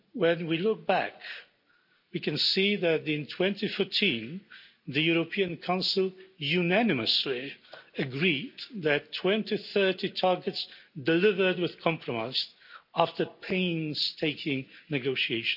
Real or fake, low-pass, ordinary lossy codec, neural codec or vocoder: real; 5.4 kHz; none; none